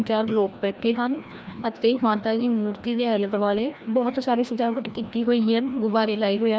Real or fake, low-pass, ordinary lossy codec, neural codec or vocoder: fake; none; none; codec, 16 kHz, 1 kbps, FreqCodec, larger model